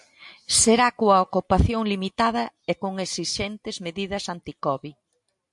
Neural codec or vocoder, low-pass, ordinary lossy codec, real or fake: none; 10.8 kHz; MP3, 64 kbps; real